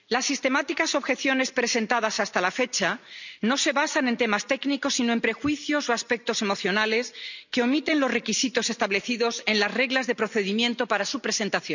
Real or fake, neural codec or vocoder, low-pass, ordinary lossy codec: real; none; 7.2 kHz; none